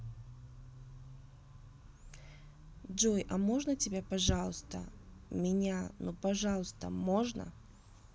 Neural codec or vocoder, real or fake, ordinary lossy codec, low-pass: none; real; none; none